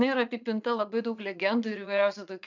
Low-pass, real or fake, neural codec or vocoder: 7.2 kHz; fake; vocoder, 44.1 kHz, 80 mel bands, Vocos